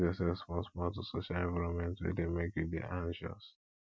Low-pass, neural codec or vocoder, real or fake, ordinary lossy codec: none; none; real; none